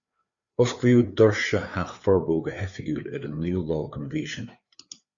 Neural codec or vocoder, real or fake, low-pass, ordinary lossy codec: codec, 16 kHz, 4 kbps, FreqCodec, larger model; fake; 7.2 kHz; Opus, 64 kbps